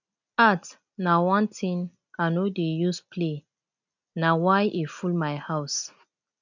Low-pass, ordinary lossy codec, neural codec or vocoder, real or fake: 7.2 kHz; none; none; real